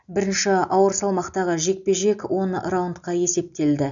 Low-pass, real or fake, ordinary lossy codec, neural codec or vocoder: 7.2 kHz; real; none; none